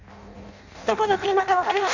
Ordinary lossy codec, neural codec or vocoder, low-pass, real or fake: none; codec, 16 kHz in and 24 kHz out, 0.6 kbps, FireRedTTS-2 codec; 7.2 kHz; fake